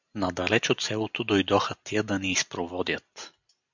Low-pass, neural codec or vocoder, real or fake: 7.2 kHz; none; real